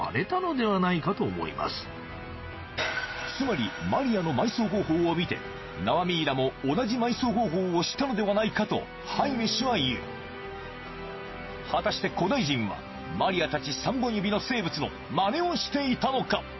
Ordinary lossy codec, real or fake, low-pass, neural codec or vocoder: MP3, 24 kbps; real; 7.2 kHz; none